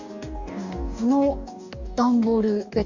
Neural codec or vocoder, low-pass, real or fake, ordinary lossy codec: codec, 44.1 kHz, 2.6 kbps, DAC; 7.2 kHz; fake; Opus, 64 kbps